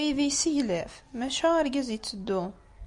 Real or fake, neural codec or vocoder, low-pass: real; none; 10.8 kHz